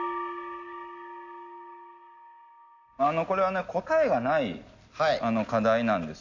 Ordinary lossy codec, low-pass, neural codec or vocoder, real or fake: AAC, 48 kbps; 7.2 kHz; none; real